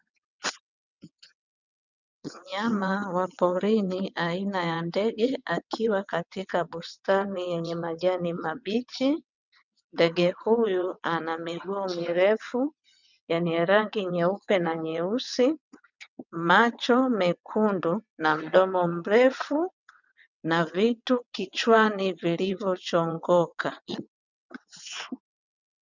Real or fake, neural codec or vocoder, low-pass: fake; vocoder, 22.05 kHz, 80 mel bands, WaveNeXt; 7.2 kHz